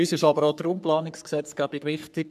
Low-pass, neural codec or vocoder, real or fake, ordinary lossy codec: 14.4 kHz; codec, 44.1 kHz, 3.4 kbps, Pupu-Codec; fake; none